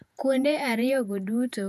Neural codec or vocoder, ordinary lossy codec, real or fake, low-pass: vocoder, 48 kHz, 128 mel bands, Vocos; none; fake; 14.4 kHz